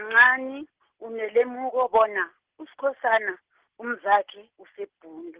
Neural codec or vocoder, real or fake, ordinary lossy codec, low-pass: none; real; Opus, 16 kbps; 3.6 kHz